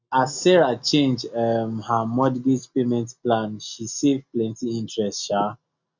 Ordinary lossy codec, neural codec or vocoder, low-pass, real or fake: none; none; 7.2 kHz; real